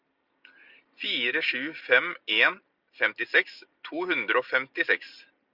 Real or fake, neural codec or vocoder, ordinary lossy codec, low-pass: real; none; Opus, 64 kbps; 5.4 kHz